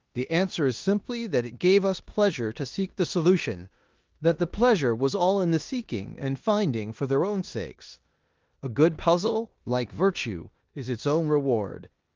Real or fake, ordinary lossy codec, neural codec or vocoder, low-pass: fake; Opus, 32 kbps; codec, 16 kHz in and 24 kHz out, 0.9 kbps, LongCat-Audio-Codec, four codebook decoder; 7.2 kHz